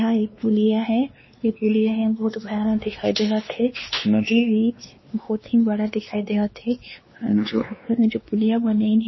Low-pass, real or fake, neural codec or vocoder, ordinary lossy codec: 7.2 kHz; fake; codec, 16 kHz, 2 kbps, X-Codec, WavLM features, trained on Multilingual LibriSpeech; MP3, 24 kbps